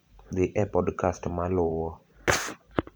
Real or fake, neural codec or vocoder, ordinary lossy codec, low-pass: fake; vocoder, 44.1 kHz, 128 mel bands every 512 samples, BigVGAN v2; none; none